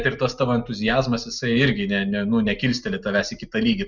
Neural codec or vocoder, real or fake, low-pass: none; real; 7.2 kHz